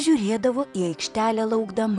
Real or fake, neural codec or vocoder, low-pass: fake; vocoder, 44.1 kHz, 128 mel bands, Pupu-Vocoder; 10.8 kHz